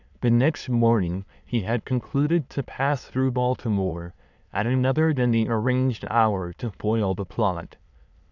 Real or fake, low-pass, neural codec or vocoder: fake; 7.2 kHz; autoencoder, 22.05 kHz, a latent of 192 numbers a frame, VITS, trained on many speakers